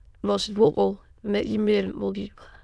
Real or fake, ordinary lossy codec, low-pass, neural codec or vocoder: fake; none; none; autoencoder, 22.05 kHz, a latent of 192 numbers a frame, VITS, trained on many speakers